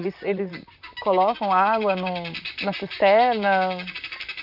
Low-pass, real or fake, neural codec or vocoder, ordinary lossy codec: 5.4 kHz; fake; vocoder, 44.1 kHz, 128 mel bands, Pupu-Vocoder; none